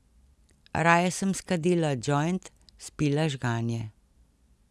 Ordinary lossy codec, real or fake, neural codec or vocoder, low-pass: none; real; none; none